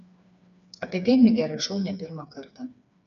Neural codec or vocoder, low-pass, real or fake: codec, 16 kHz, 4 kbps, FreqCodec, smaller model; 7.2 kHz; fake